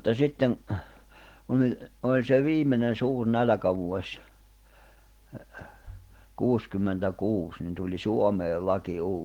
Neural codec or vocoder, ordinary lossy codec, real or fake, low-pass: none; Opus, 16 kbps; real; 19.8 kHz